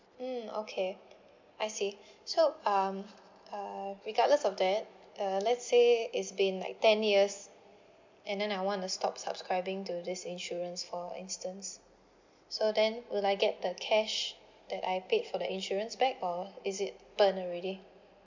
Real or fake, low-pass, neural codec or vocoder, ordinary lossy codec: real; 7.2 kHz; none; MP3, 64 kbps